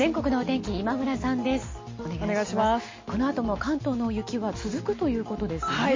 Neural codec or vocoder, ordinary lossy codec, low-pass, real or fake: none; MP3, 32 kbps; 7.2 kHz; real